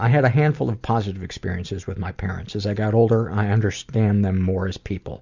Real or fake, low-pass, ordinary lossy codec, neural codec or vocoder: real; 7.2 kHz; Opus, 64 kbps; none